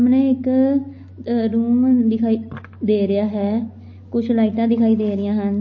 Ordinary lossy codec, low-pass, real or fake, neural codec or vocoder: MP3, 32 kbps; 7.2 kHz; real; none